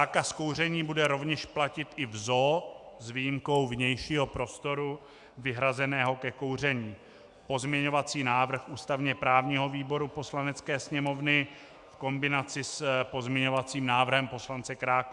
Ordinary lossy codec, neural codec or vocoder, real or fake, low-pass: Opus, 64 kbps; autoencoder, 48 kHz, 128 numbers a frame, DAC-VAE, trained on Japanese speech; fake; 10.8 kHz